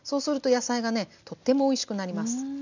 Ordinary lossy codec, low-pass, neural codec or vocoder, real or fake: none; 7.2 kHz; none; real